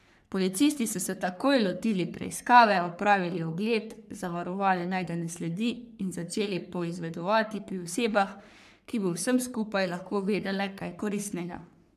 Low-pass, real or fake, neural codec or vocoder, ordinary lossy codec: 14.4 kHz; fake; codec, 44.1 kHz, 3.4 kbps, Pupu-Codec; none